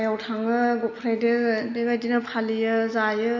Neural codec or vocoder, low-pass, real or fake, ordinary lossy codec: none; 7.2 kHz; real; MP3, 48 kbps